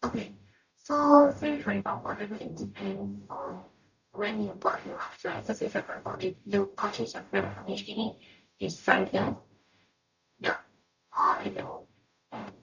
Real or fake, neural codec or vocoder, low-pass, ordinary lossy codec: fake; codec, 44.1 kHz, 0.9 kbps, DAC; 7.2 kHz; none